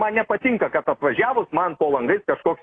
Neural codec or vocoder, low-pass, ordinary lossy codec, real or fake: none; 9.9 kHz; AAC, 32 kbps; real